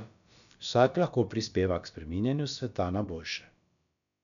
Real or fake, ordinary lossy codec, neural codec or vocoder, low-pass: fake; none; codec, 16 kHz, about 1 kbps, DyCAST, with the encoder's durations; 7.2 kHz